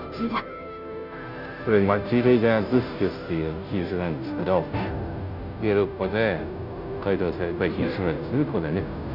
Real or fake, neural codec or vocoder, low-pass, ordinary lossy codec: fake; codec, 16 kHz, 0.5 kbps, FunCodec, trained on Chinese and English, 25 frames a second; 5.4 kHz; none